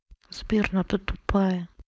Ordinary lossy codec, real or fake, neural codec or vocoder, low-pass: none; fake; codec, 16 kHz, 4.8 kbps, FACodec; none